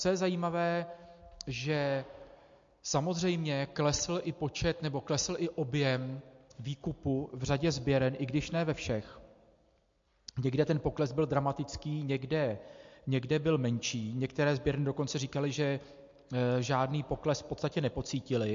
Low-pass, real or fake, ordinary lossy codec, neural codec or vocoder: 7.2 kHz; real; MP3, 48 kbps; none